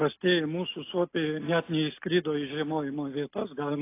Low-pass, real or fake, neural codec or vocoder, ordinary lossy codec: 3.6 kHz; real; none; AAC, 24 kbps